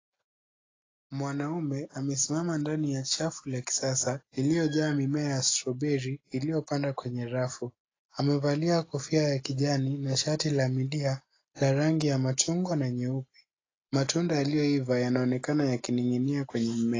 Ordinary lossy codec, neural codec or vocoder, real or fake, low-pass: AAC, 32 kbps; none; real; 7.2 kHz